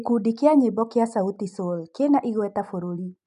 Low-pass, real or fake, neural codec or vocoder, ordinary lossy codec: 10.8 kHz; real; none; none